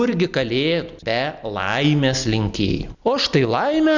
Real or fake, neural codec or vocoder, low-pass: real; none; 7.2 kHz